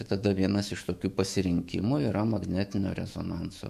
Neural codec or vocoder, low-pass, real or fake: autoencoder, 48 kHz, 128 numbers a frame, DAC-VAE, trained on Japanese speech; 14.4 kHz; fake